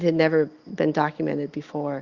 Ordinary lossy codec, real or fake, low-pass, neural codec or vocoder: Opus, 64 kbps; real; 7.2 kHz; none